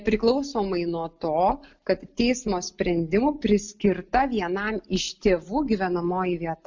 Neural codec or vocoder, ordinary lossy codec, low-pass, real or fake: none; MP3, 64 kbps; 7.2 kHz; real